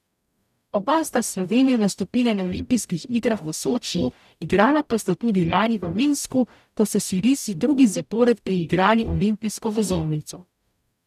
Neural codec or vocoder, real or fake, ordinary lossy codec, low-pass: codec, 44.1 kHz, 0.9 kbps, DAC; fake; none; 14.4 kHz